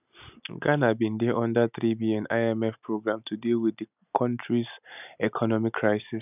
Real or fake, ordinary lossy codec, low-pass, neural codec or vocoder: real; none; 3.6 kHz; none